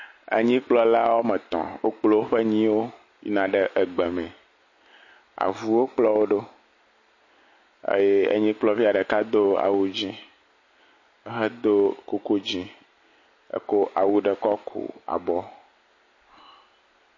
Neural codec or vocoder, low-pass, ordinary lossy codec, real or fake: none; 7.2 kHz; MP3, 32 kbps; real